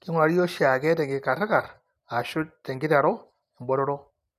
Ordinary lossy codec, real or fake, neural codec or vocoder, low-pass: none; real; none; 14.4 kHz